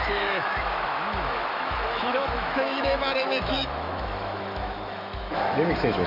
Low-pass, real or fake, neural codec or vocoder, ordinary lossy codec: 5.4 kHz; fake; autoencoder, 48 kHz, 128 numbers a frame, DAC-VAE, trained on Japanese speech; MP3, 48 kbps